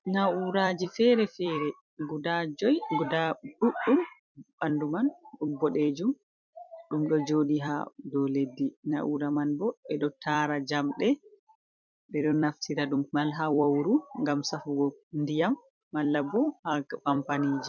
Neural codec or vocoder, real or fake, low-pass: vocoder, 44.1 kHz, 128 mel bands every 256 samples, BigVGAN v2; fake; 7.2 kHz